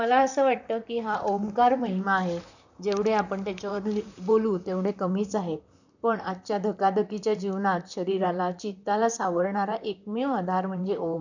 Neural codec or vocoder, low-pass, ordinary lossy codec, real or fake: vocoder, 44.1 kHz, 128 mel bands, Pupu-Vocoder; 7.2 kHz; none; fake